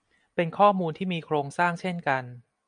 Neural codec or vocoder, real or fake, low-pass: none; real; 9.9 kHz